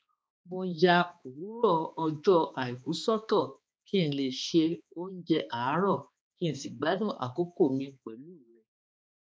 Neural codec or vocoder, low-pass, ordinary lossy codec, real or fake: codec, 16 kHz, 2 kbps, X-Codec, HuBERT features, trained on balanced general audio; none; none; fake